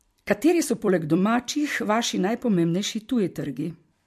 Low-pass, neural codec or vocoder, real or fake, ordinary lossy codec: 14.4 kHz; vocoder, 44.1 kHz, 128 mel bands every 256 samples, BigVGAN v2; fake; MP3, 64 kbps